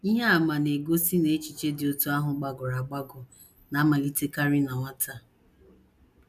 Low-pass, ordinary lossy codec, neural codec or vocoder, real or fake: 14.4 kHz; none; none; real